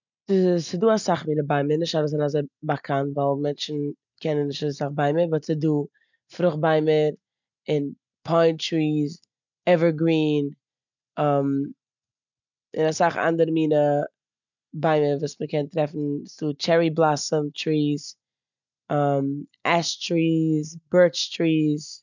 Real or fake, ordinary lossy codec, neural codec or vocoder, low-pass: real; none; none; 7.2 kHz